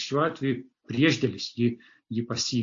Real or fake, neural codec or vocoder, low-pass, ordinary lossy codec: real; none; 7.2 kHz; AAC, 48 kbps